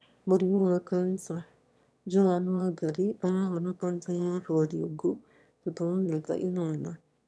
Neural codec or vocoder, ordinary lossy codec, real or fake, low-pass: autoencoder, 22.05 kHz, a latent of 192 numbers a frame, VITS, trained on one speaker; none; fake; none